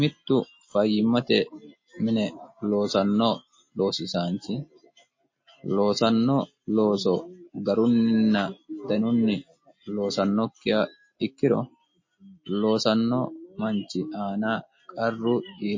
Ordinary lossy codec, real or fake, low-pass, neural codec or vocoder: MP3, 32 kbps; real; 7.2 kHz; none